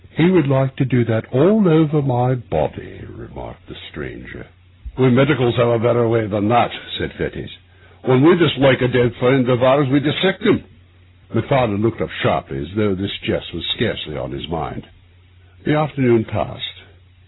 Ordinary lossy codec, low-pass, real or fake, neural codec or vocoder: AAC, 16 kbps; 7.2 kHz; real; none